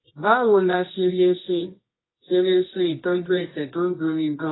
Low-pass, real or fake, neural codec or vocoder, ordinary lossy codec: 7.2 kHz; fake; codec, 24 kHz, 0.9 kbps, WavTokenizer, medium music audio release; AAC, 16 kbps